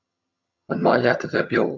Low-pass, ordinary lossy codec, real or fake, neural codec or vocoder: 7.2 kHz; MP3, 64 kbps; fake; vocoder, 22.05 kHz, 80 mel bands, HiFi-GAN